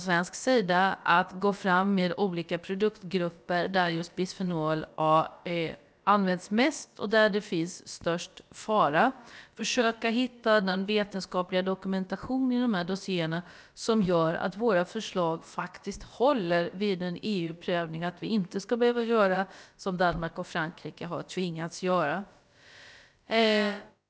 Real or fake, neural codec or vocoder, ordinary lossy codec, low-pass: fake; codec, 16 kHz, about 1 kbps, DyCAST, with the encoder's durations; none; none